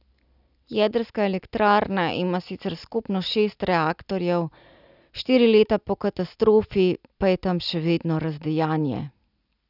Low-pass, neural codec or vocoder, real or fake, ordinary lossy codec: 5.4 kHz; none; real; none